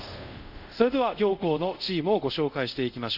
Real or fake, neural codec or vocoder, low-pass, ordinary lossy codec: fake; codec, 24 kHz, 0.5 kbps, DualCodec; 5.4 kHz; none